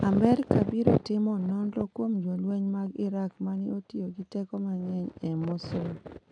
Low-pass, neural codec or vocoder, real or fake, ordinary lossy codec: 9.9 kHz; none; real; none